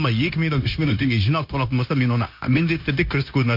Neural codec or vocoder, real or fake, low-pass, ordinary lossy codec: codec, 16 kHz, 0.9 kbps, LongCat-Audio-Codec; fake; 5.4 kHz; MP3, 48 kbps